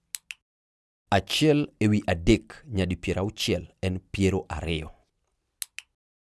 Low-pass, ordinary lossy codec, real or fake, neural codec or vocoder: none; none; real; none